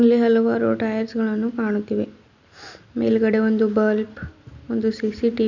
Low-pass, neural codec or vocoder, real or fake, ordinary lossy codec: 7.2 kHz; none; real; none